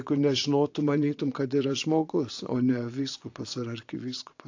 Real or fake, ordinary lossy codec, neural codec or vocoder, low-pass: fake; AAC, 48 kbps; vocoder, 22.05 kHz, 80 mel bands, Vocos; 7.2 kHz